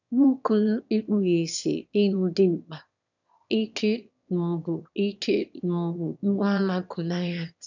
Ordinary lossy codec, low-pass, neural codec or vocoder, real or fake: none; 7.2 kHz; autoencoder, 22.05 kHz, a latent of 192 numbers a frame, VITS, trained on one speaker; fake